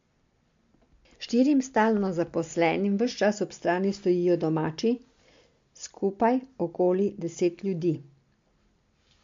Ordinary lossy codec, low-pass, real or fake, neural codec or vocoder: MP3, 48 kbps; 7.2 kHz; real; none